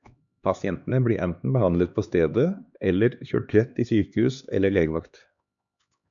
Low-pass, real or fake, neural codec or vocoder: 7.2 kHz; fake; codec, 16 kHz, 2 kbps, X-Codec, HuBERT features, trained on LibriSpeech